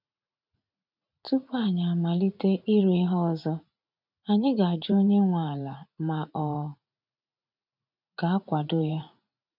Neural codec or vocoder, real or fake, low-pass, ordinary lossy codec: vocoder, 44.1 kHz, 80 mel bands, Vocos; fake; 5.4 kHz; none